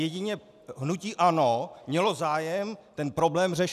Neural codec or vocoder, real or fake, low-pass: none; real; 14.4 kHz